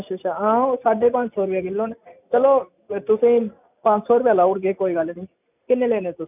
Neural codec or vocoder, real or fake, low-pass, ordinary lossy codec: none; real; 3.6 kHz; none